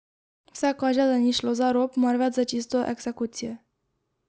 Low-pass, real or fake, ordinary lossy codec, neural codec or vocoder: none; real; none; none